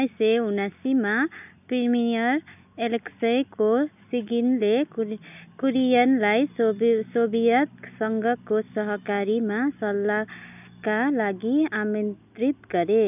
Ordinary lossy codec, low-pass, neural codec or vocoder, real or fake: none; 3.6 kHz; none; real